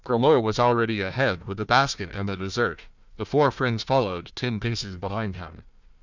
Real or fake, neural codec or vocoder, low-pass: fake; codec, 16 kHz, 1 kbps, FunCodec, trained on Chinese and English, 50 frames a second; 7.2 kHz